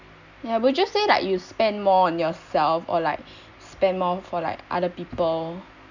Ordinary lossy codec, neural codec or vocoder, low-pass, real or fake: none; none; 7.2 kHz; real